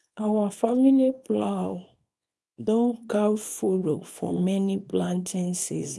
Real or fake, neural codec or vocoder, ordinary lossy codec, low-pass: fake; codec, 24 kHz, 0.9 kbps, WavTokenizer, small release; none; none